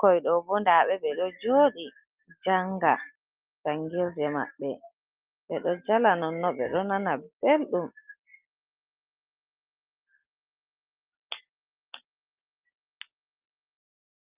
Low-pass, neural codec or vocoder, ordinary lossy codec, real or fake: 3.6 kHz; none; Opus, 24 kbps; real